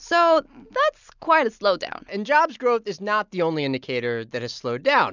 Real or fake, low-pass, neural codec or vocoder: real; 7.2 kHz; none